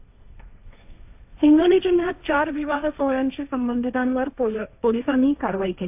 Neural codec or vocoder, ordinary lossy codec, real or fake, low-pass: codec, 16 kHz, 1.1 kbps, Voila-Tokenizer; AAC, 32 kbps; fake; 3.6 kHz